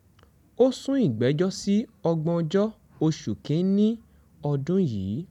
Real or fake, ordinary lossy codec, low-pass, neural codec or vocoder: real; none; 19.8 kHz; none